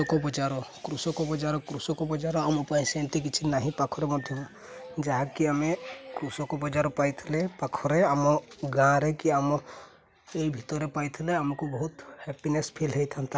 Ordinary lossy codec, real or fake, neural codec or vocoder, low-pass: none; real; none; none